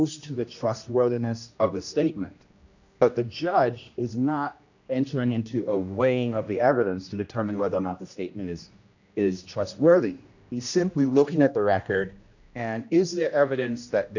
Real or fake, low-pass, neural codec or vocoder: fake; 7.2 kHz; codec, 16 kHz, 1 kbps, X-Codec, HuBERT features, trained on general audio